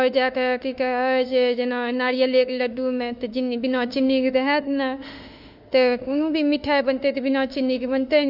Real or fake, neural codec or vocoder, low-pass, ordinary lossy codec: fake; autoencoder, 48 kHz, 32 numbers a frame, DAC-VAE, trained on Japanese speech; 5.4 kHz; none